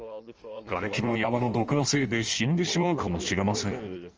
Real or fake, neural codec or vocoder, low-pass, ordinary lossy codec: fake; codec, 16 kHz in and 24 kHz out, 1.1 kbps, FireRedTTS-2 codec; 7.2 kHz; Opus, 24 kbps